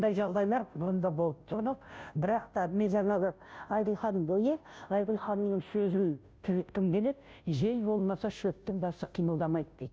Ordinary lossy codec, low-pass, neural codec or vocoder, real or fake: none; none; codec, 16 kHz, 0.5 kbps, FunCodec, trained on Chinese and English, 25 frames a second; fake